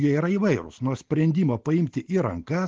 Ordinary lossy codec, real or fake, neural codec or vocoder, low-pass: Opus, 16 kbps; real; none; 7.2 kHz